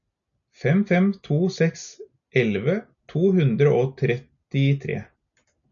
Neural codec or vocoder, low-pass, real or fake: none; 7.2 kHz; real